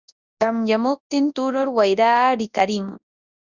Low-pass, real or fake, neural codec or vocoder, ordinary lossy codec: 7.2 kHz; fake; codec, 24 kHz, 0.9 kbps, WavTokenizer, large speech release; Opus, 64 kbps